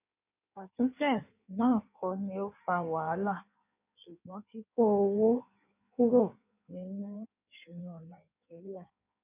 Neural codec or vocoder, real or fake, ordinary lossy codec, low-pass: codec, 16 kHz in and 24 kHz out, 1.1 kbps, FireRedTTS-2 codec; fake; none; 3.6 kHz